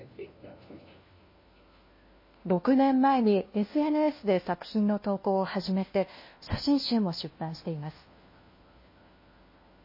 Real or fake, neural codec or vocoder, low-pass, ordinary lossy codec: fake; codec, 16 kHz, 1 kbps, FunCodec, trained on LibriTTS, 50 frames a second; 5.4 kHz; MP3, 24 kbps